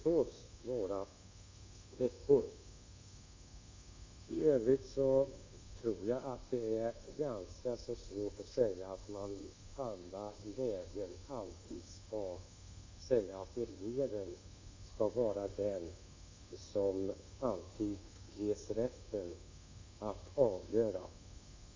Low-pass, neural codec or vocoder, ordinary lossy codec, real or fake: 7.2 kHz; codec, 24 kHz, 1.2 kbps, DualCodec; MP3, 48 kbps; fake